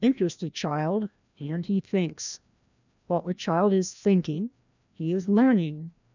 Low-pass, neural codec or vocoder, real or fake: 7.2 kHz; codec, 16 kHz, 1 kbps, FreqCodec, larger model; fake